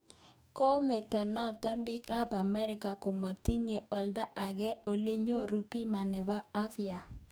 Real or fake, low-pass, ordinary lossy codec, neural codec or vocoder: fake; none; none; codec, 44.1 kHz, 2.6 kbps, DAC